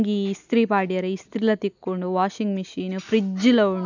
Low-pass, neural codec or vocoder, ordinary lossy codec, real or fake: 7.2 kHz; none; none; real